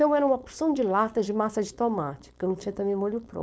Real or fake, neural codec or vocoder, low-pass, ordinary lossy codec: fake; codec, 16 kHz, 4.8 kbps, FACodec; none; none